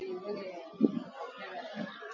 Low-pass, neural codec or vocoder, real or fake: 7.2 kHz; none; real